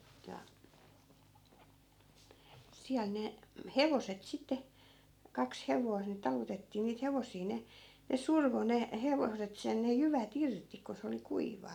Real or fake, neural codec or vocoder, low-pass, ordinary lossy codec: real; none; 19.8 kHz; none